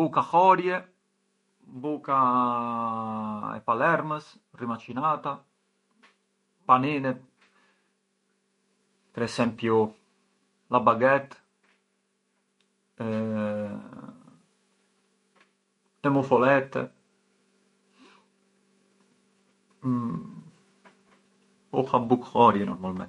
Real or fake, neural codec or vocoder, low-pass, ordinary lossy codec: fake; autoencoder, 48 kHz, 128 numbers a frame, DAC-VAE, trained on Japanese speech; 19.8 kHz; MP3, 48 kbps